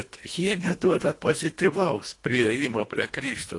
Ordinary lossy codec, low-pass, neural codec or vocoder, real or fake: AAC, 48 kbps; 10.8 kHz; codec, 24 kHz, 1.5 kbps, HILCodec; fake